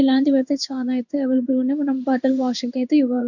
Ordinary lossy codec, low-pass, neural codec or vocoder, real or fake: none; 7.2 kHz; codec, 16 kHz in and 24 kHz out, 1 kbps, XY-Tokenizer; fake